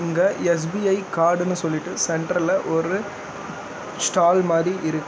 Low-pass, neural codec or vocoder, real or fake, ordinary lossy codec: none; none; real; none